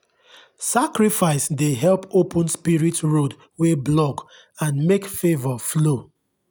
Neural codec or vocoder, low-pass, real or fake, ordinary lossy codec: none; none; real; none